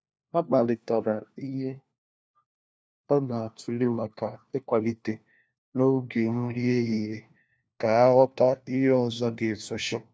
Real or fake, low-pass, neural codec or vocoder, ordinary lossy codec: fake; none; codec, 16 kHz, 1 kbps, FunCodec, trained on LibriTTS, 50 frames a second; none